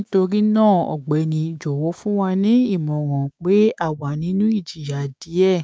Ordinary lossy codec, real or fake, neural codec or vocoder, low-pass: none; fake; codec, 16 kHz, 6 kbps, DAC; none